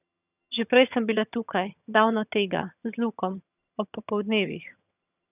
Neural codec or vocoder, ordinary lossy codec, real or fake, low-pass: vocoder, 22.05 kHz, 80 mel bands, HiFi-GAN; none; fake; 3.6 kHz